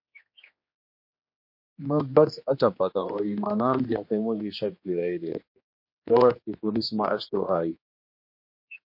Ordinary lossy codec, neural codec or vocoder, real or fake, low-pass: MP3, 32 kbps; codec, 16 kHz, 2 kbps, X-Codec, HuBERT features, trained on general audio; fake; 5.4 kHz